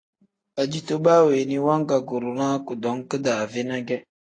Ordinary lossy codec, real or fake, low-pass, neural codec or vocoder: AAC, 32 kbps; real; 9.9 kHz; none